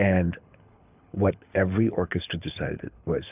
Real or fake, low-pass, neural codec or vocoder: fake; 3.6 kHz; codec, 24 kHz, 6 kbps, HILCodec